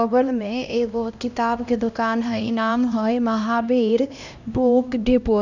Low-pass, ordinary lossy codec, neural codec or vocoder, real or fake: 7.2 kHz; none; codec, 16 kHz, 1 kbps, X-Codec, HuBERT features, trained on LibriSpeech; fake